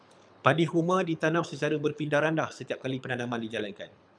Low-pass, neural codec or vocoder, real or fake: 9.9 kHz; codec, 24 kHz, 6 kbps, HILCodec; fake